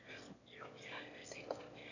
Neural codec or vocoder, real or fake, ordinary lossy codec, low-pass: autoencoder, 22.05 kHz, a latent of 192 numbers a frame, VITS, trained on one speaker; fake; none; 7.2 kHz